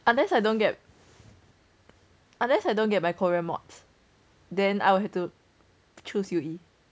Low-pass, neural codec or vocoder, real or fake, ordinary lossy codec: none; none; real; none